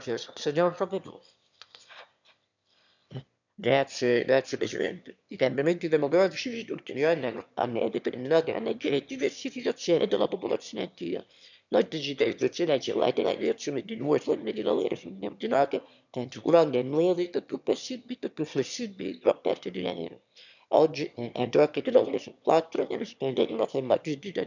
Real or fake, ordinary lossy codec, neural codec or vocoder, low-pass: fake; none; autoencoder, 22.05 kHz, a latent of 192 numbers a frame, VITS, trained on one speaker; 7.2 kHz